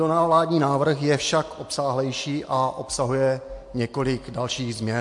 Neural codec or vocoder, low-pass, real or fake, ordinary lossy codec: none; 10.8 kHz; real; MP3, 48 kbps